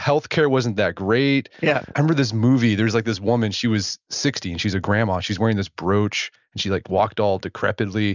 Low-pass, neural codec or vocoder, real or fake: 7.2 kHz; none; real